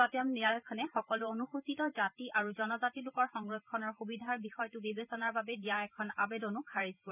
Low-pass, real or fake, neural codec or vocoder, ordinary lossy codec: 3.6 kHz; fake; vocoder, 44.1 kHz, 128 mel bands every 512 samples, BigVGAN v2; none